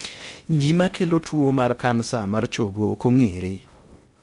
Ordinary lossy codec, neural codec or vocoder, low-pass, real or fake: MP3, 64 kbps; codec, 16 kHz in and 24 kHz out, 0.8 kbps, FocalCodec, streaming, 65536 codes; 10.8 kHz; fake